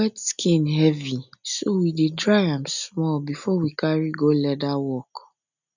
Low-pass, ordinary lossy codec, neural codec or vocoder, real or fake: 7.2 kHz; none; none; real